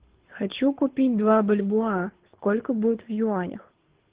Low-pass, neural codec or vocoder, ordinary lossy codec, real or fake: 3.6 kHz; codec, 24 kHz, 6 kbps, HILCodec; Opus, 16 kbps; fake